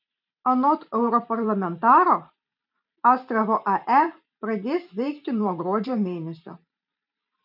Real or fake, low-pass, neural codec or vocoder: fake; 5.4 kHz; vocoder, 44.1 kHz, 128 mel bands every 512 samples, BigVGAN v2